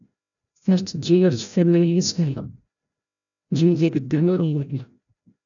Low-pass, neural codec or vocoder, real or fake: 7.2 kHz; codec, 16 kHz, 0.5 kbps, FreqCodec, larger model; fake